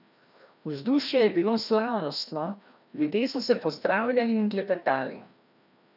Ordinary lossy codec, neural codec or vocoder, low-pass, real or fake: none; codec, 16 kHz, 1 kbps, FreqCodec, larger model; 5.4 kHz; fake